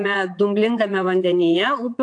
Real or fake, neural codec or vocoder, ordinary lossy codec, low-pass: fake; vocoder, 22.05 kHz, 80 mel bands, WaveNeXt; AAC, 64 kbps; 9.9 kHz